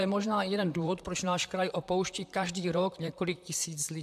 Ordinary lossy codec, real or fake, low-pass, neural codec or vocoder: AAC, 96 kbps; fake; 14.4 kHz; vocoder, 44.1 kHz, 128 mel bands, Pupu-Vocoder